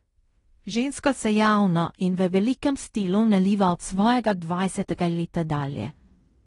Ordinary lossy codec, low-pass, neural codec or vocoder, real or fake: AAC, 32 kbps; 10.8 kHz; codec, 16 kHz in and 24 kHz out, 0.9 kbps, LongCat-Audio-Codec, fine tuned four codebook decoder; fake